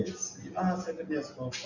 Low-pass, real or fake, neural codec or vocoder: 7.2 kHz; real; none